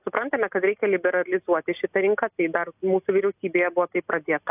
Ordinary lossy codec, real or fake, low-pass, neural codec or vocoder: AAC, 32 kbps; real; 3.6 kHz; none